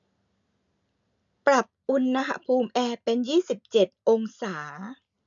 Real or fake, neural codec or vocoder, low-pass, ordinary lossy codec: real; none; 7.2 kHz; none